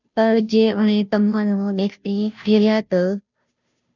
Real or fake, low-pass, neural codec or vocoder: fake; 7.2 kHz; codec, 16 kHz, 0.5 kbps, FunCodec, trained on Chinese and English, 25 frames a second